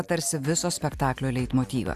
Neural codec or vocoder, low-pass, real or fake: none; 14.4 kHz; real